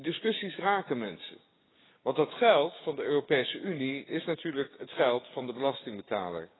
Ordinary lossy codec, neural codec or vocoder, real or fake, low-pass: AAC, 16 kbps; codec, 16 kHz, 6 kbps, DAC; fake; 7.2 kHz